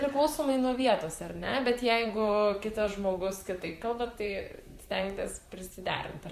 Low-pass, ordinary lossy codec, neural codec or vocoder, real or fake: 14.4 kHz; Opus, 64 kbps; vocoder, 44.1 kHz, 128 mel bands, Pupu-Vocoder; fake